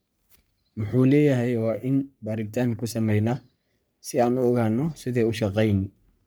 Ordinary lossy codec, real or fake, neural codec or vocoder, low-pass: none; fake; codec, 44.1 kHz, 3.4 kbps, Pupu-Codec; none